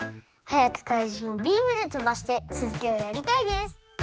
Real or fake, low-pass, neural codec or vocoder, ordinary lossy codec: fake; none; codec, 16 kHz, 2 kbps, X-Codec, HuBERT features, trained on general audio; none